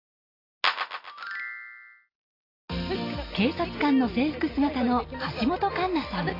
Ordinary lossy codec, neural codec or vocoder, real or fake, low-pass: AAC, 24 kbps; none; real; 5.4 kHz